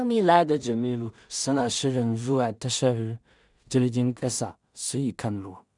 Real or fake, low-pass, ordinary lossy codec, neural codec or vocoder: fake; 10.8 kHz; none; codec, 16 kHz in and 24 kHz out, 0.4 kbps, LongCat-Audio-Codec, two codebook decoder